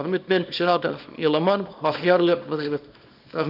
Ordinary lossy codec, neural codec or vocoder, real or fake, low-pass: none; codec, 24 kHz, 0.9 kbps, WavTokenizer, small release; fake; 5.4 kHz